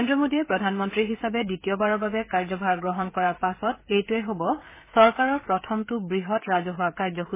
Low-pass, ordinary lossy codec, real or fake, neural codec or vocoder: 3.6 kHz; MP3, 16 kbps; fake; codec, 16 kHz, 16 kbps, FreqCodec, smaller model